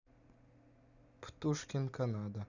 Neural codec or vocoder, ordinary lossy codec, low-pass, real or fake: none; none; 7.2 kHz; real